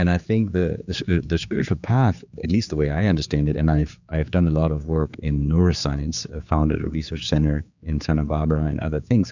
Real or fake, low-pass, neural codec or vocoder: fake; 7.2 kHz; codec, 16 kHz, 4 kbps, X-Codec, HuBERT features, trained on general audio